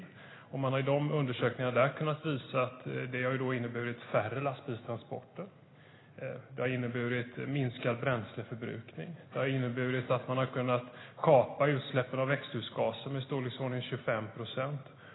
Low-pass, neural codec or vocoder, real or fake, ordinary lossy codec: 7.2 kHz; none; real; AAC, 16 kbps